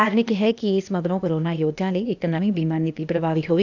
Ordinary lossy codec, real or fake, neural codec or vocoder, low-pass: none; fake; codec, 16 kHz, 0.8 kbps, ZipCodec; 7.2 kHz